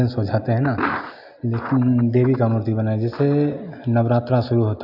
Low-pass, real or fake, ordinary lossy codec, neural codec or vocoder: 5.4 kHz; real; AAC, 48 kbps; none